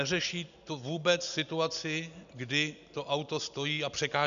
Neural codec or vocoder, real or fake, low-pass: none; real; 7.2 kHz